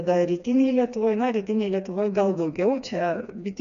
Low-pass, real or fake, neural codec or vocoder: 7.2 kHz; fake; codec, 16 kHz, 2 kbps, FreqCodec, smaller model